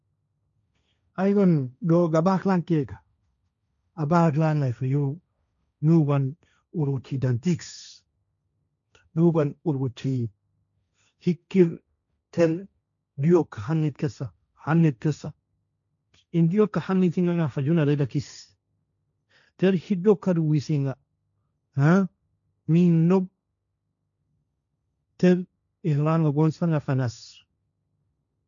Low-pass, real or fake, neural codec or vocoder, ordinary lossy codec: 7.2 kHz; fake; codec, 16 kHz, 1.1 kbps, Voila-Tokenizer; none